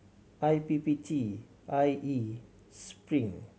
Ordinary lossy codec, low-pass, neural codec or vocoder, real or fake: none; none; none; real